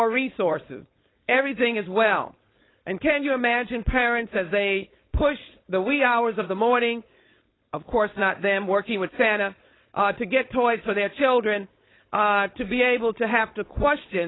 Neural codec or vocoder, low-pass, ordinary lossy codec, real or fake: codec, 16 kHz, 4.8 kbps, FACodec; 7.2 kHz; AAC, 16 kbps; fake